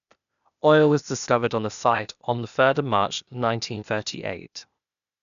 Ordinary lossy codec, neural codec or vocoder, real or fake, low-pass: none; codec, 16 kHz, 0.8 kbps, ZipCodec; fake; 7.2 kHz